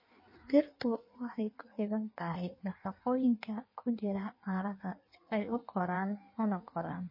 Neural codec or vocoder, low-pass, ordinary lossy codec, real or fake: codec, 16 kHz in and 24 kHz out, 1.1 kbps, FireRedTTS-2 codec; 5.4 kHz; MP3, 24 kbps; fake